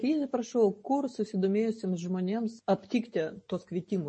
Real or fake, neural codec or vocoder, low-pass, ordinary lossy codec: real; none; 9.9 kHz; MP3, 32 kbps